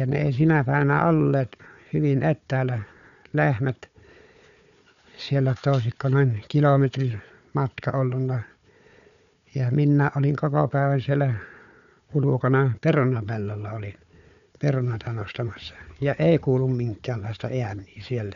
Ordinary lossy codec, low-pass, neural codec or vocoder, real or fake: none; 7.2 kHz; codec, 16 kHz, 4 kbps, FunCodec, trained on Chinese and English, 50 frames a second; fake